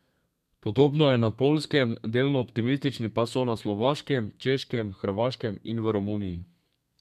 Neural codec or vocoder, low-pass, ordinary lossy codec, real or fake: codec, 32 kHz, 1.9 kbps, SNAC; 14.4 kHz; none; fake